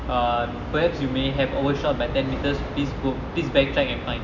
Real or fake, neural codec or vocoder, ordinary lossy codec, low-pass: real; none; none; 7.2 kHz